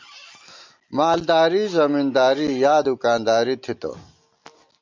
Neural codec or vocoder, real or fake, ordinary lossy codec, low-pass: none; real; MP3, 64 kbps; 7.2 kHz